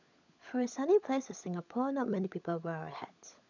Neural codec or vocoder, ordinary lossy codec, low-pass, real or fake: codec, 16 kHz, 16 kbps, FunCodec, trained on LibriTTS, 50 frames a second; none; 7.2 kHz; fake